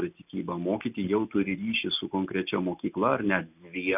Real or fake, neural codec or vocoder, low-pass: real; none; 3.6 kHz